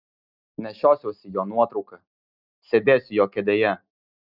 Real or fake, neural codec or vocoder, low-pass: real; none; 5.4 kHz